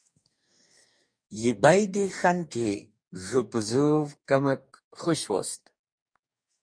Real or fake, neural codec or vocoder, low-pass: fake; codec, 44.1 kHz, 2.6 kbps, DAC; 9.9 kHz